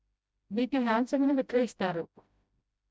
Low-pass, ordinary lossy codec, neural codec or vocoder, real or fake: none; none; codec, 16 kHz, 0.5 kbps, FreqCodec, smaller model; fake